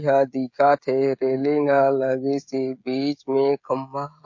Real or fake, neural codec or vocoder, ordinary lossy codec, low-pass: fake; codec, 16 kHz, 16 kbps, FreqCodec, smaller model; MP3, 48 kbps; 7.2 kHz